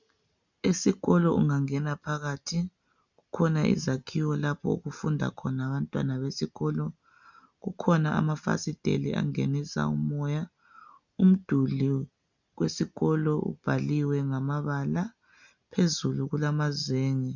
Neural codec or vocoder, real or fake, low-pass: none; real; 7.2 kHz